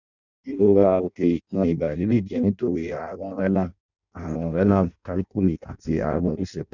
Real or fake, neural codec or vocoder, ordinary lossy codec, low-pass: fake; codec, 16 kHz in and 24 kHz out, 0.6 kbps, FireRedTTS-2 codec; none; 7.2 kHz